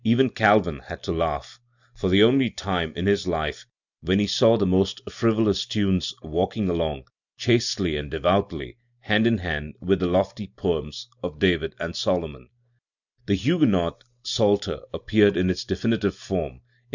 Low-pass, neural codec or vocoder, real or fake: 7.2 kHz; none; real